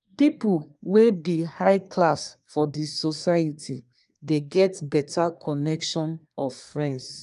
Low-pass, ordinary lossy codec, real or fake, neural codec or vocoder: 10.8 kHz; none; fake; codec, 24 kHz, 1 kbps, SNAC